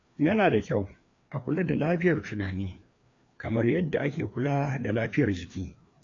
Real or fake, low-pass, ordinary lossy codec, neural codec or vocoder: fake; 7.2 kHz; AAC, 48 kbps; codec, 16 kHz, 2 kbps, FreqCodec, larger model